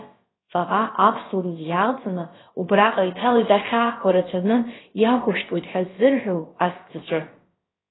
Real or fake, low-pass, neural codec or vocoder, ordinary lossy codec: fake; 7.2 kHz; codec, 16 kHz, about 1 kbps, DyCAST, with the encoder's durations; AAC, 16 kbps